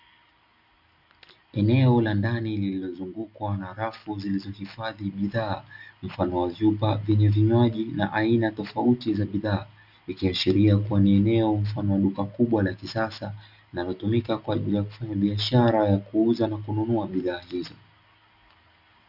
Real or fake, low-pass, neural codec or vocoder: real; 5.4 kHz; none